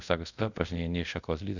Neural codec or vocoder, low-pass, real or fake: codec, 24 kHz, 0.5 kbps, DualCodec; 7.2 kHz; fake